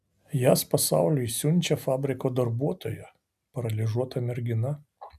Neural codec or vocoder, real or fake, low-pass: none; real; 14.4 kHz